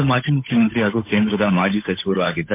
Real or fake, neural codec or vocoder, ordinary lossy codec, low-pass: fake; codec, 16 kHz, 8 kbps, FunCodec, trained on Chinese and English, 25 frames a second; MP3, 32 kbps; 3.6 kHz